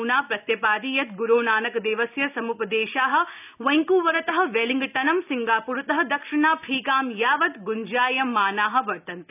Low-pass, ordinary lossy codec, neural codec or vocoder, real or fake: 3.6 kHz; none; none; real